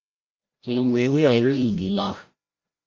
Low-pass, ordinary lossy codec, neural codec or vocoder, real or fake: 7.2 kHz; Opus, 32 kbps; codec, 16 kHz, 0.5 kbps, FreqCodec, larger model; fake